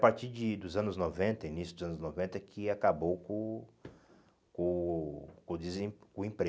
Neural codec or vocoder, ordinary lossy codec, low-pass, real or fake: none; none; none; real